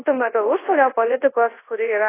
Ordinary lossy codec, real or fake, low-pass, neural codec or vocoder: AAC, 16 kbps; fake; 3.6 kHz; codec, 24 kHz, 0.9 kbps, WavTokenizer, large speech release